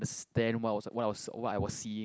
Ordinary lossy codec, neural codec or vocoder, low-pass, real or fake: none; none; none; real